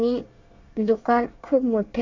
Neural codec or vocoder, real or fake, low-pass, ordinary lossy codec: codec, 24 kHz, 1 kbps, SNAC; fake; 7.2 kHz; AAC, 48 kbps